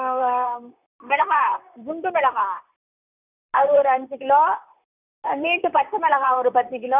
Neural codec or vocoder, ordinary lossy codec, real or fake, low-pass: vocoder, 44.1 kHz, 128 mel bands, Pupu-Vocoder; none; fake; 3.6 kHz